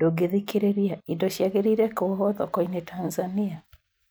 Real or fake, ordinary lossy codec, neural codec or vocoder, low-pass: real; none; none; none